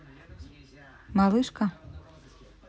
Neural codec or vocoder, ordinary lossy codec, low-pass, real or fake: none; none; none; real